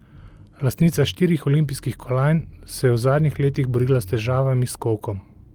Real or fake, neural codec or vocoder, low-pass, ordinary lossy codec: real; none; 19.8 kHz; Opus, 24 kbps